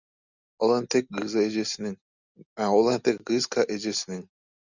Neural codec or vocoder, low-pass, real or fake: none; 7.2 kHz; real